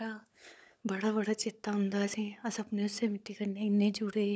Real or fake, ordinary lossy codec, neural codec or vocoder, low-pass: fake; none; codec, 16 kHz, 16 kbps, FunCodec, trained on LibriTTS, 50 frames a second; none